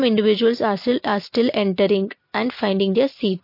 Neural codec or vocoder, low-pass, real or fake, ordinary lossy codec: none; 5.4 kHz; real; MP3, 32 kbps